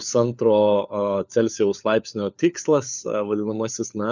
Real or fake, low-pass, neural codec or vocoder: fake; 7.2 kHz; codec, 16 kHz, 4 kbps, FunCodec, trained on LibriTTS, 50 frames a second